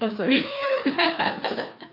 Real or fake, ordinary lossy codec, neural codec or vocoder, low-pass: fake; AAC, 48 kbps; codec, 16 kHz, 2 kbps, FreqCodec, larger model; 5.4 kHz